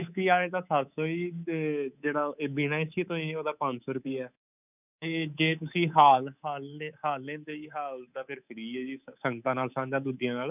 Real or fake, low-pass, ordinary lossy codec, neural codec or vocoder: fake; 3.6 kHz; none; codec, 24 kHz, 3.1 kbps, DualCodec